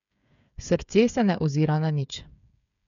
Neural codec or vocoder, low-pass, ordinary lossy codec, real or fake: codec, 16 kHz, 8 kbps, FreqCodec, smaller model; 7.2 kHz; none; fake